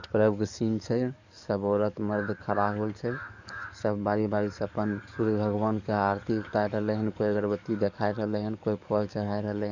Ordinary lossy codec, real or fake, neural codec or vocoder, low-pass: none; fake; codec, 44.1 kHz, 7.8 kbps, DAC; 7.2 kHz